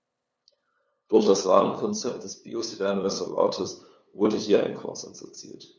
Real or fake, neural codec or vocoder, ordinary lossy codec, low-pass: fake; codec, 16 kHz, 2 kbps, FunCodec, trained on LibriTTS, 25 frames a second; none; none